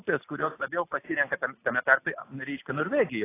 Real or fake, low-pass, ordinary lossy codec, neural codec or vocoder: real; 3.6 kHz; AAC, 24 kbps; none